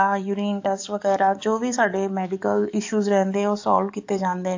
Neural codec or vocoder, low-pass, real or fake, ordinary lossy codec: codec, 44.1 kHz, 7.8 kbps, DAC; 7.2 kHz; fake; AAC, 48 kbps